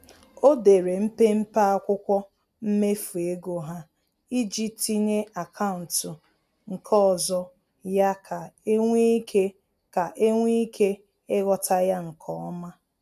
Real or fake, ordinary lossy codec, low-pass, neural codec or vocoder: real; none; 14.4 kHz; none